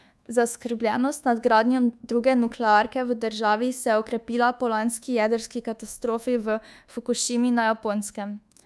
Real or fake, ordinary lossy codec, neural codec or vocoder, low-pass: fake; none; codec, 24 kHz, 1.2 kbps, DualCodec; none